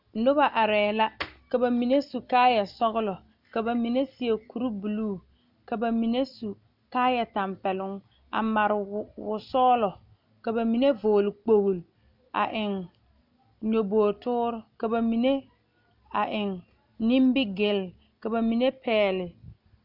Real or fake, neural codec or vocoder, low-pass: real; none; 5.4 kHz